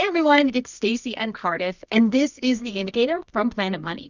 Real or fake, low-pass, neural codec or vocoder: fake; 7.2 kHz; codec, 24 kHz, 0.9 kbps, WavTokenizer, medium music audio release